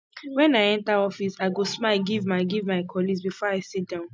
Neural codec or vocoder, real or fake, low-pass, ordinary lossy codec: none; real; none; none